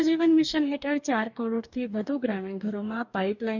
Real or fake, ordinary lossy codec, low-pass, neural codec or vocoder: fake; none; 7.2 kHz; codec, 44.1 kHz, 2.6 kbps, DAC